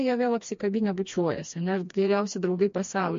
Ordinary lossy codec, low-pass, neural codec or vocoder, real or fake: MP3, 48 kbps; 7.2 kHz; codec, 16 kHz, 2 kbps, FreqCodec, smaller model; fake